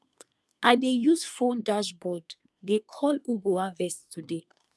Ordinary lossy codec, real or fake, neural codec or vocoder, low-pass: none; fake; codec, 24 kHz, 1 kbps, SNAC; none